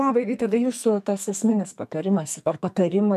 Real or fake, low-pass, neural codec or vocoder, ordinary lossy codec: fake; 14.4 kHz; codec, 44.1 kHz, 2.6 kbps, SNAC; MP3, 96 kbps